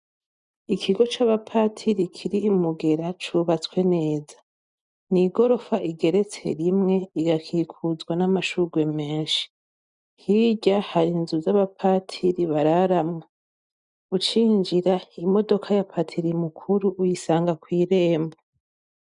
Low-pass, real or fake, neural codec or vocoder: 9.9 kHz; real; none